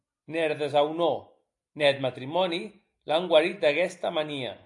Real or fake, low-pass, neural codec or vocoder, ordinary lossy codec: real; 10.8 kHz; none; AAC, 64 kbps